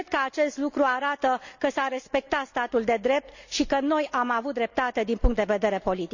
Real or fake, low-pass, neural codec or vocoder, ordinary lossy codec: real; 7.2 kHz; none; none